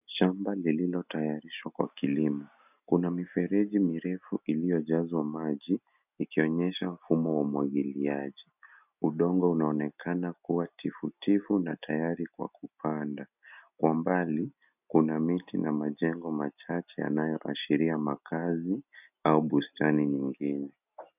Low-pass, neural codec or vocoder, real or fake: 3.6 kHz; none; real